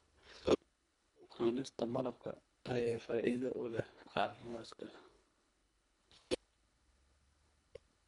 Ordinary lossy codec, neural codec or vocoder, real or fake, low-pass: none; codec, 24 kHz, 1.5 kbps, HILCodec; fake; 10.8 kHz